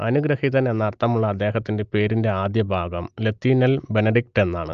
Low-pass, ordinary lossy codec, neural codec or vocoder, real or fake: 7.2 kHz; Opus, 24 kbps; none; real